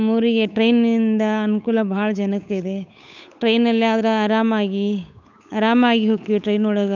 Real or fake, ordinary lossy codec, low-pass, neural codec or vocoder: fake; none; 7.2 kHz; codec, 16 kHz, 8 kbps, FunCodec, trained on Chinese and English, 25 frames a second